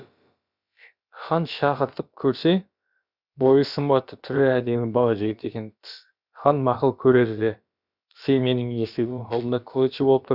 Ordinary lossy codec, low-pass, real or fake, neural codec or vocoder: Opus, 64 kbps; 5.4 kHz; fake; codec, 16 kHz, about 1 kbps, DyCAST, with the encoder's durations